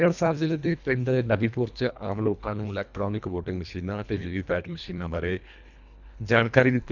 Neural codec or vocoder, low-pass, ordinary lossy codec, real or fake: codec, 24 kHz, 1.5 kbps, HILCodec; 7.2 kHz; none; fake